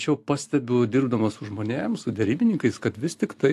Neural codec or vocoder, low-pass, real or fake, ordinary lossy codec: none; 14.4 kHz; real; AAC, 64 kbps